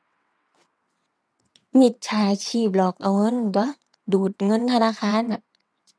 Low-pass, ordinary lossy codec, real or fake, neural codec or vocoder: none; none; fake; vocoder, 22.05 kHz, 80 mel bands, WaveNeXt